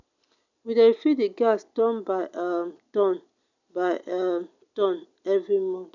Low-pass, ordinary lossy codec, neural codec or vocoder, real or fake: 7.2 kHz; none; none; real